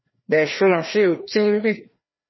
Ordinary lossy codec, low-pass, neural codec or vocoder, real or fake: MP3, 24 kbps; 7.2 kHz; codec, 16 kHz, 1 kbps, FreqCodec, larger model; fake